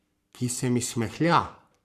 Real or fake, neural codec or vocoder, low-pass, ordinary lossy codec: fake; codec, 44.1 kHz, 7.8 kbps, Pupu-Codec; 14.4 kHz; Opus, 64 kbps